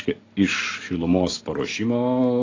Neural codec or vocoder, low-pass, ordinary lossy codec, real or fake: none; 7.2 kHz; AAC, 32 kbps; real